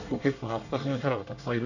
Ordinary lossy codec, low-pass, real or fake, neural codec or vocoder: none; 7.2 kHz; fake; codec, 24 kHz, 1 kbps, SNAC